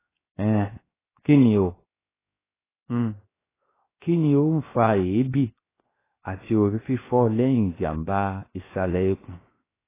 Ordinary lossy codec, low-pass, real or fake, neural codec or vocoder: AAC, 16 kbps; 3.6 kHz; fake; codec, 16 kHz, 0.7 kbps, FocalCodec